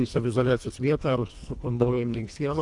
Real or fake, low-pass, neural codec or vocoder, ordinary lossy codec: fake; 10.8 kHz; codec, 24 kHz, 1.5 kbps, HILCodec; AAC, 64 kbps